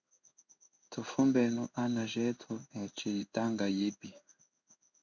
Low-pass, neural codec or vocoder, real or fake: 7.2 kHz; codec, 16 kHz in and 24 kHz out, 1 kbps, XY-Tokenizer; fake